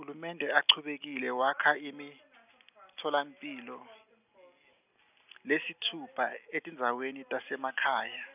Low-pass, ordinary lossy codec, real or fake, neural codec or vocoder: 3.6 kHz; none; real; none